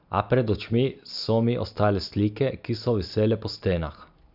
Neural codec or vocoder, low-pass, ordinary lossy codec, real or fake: none; 5.4 kHz; none; real